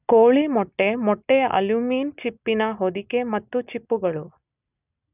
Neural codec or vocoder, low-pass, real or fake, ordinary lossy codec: none; 3.6 kHz; real; none